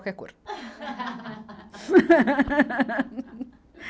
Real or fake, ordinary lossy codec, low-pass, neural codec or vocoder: real; none; none; none